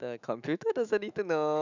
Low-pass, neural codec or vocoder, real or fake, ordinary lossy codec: 7.2 kHz; autoencoder, 48 kHz, 128 numbers a frame, DAC-VAE, trained on Japanese speech; fake; none